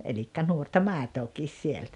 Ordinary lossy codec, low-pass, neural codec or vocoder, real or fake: none; 10.8 kHz; none; real